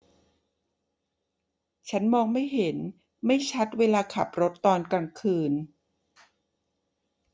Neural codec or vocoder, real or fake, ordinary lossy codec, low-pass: none; real; none; none